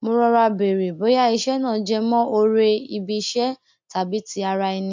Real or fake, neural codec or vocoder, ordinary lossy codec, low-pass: real; none; MP3, 64 kbps; 7.2 kHz